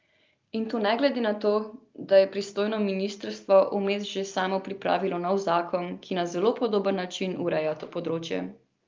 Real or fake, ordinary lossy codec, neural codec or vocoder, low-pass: real; Opus, 24 kbps; none; 7.2 kHz